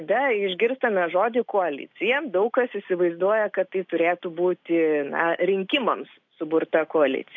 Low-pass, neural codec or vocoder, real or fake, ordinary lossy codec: 7.2 kHz; none; real; AAC, 48 kbps